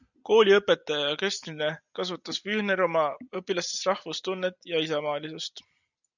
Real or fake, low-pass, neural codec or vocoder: real; 7.2 kHz; none